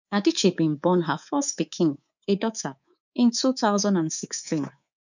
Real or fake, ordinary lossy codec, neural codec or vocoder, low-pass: fake; none; codec, 24 kHz, 3.1 kbps, DualCodec; 7.2 kHz